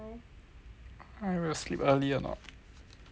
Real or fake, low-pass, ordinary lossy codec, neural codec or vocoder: real; none; none; none